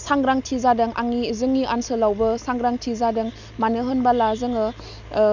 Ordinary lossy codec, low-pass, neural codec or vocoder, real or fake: none; 7.2 kHz; none; real